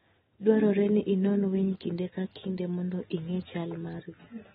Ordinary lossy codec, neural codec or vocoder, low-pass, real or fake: AAC, 16 kbps; none; 19.8 kHz; real